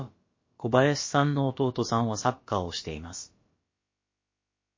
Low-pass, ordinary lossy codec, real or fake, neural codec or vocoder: 7.2 kHz; MP3, 32 kbps; fake; codec, 16 kHz, about 1 kbps, DyCAST, with the encoder's durations